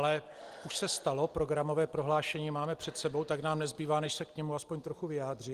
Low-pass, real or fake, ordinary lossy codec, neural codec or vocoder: 14.4 kHz; real; Opus, 24 kbps; none